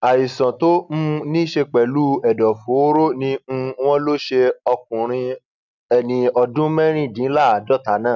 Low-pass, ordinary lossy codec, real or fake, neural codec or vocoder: 7.2 kHz; none; real; none